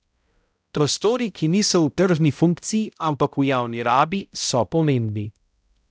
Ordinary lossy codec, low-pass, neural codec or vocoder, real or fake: none; none; codec, 16 kHz, 0.5 kbps, X-Codec, HuBERT features, trained on balanced general audio; fake